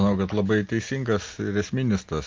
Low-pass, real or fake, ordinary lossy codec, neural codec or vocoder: 7.2 kHz; real; Opus, 32 kbps; none